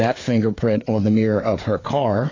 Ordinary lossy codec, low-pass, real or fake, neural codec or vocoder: AAC, 32 kbps; 7.2 kHz; fake; codec, 16 kHz, 4 kbps, FreqCodec, larger model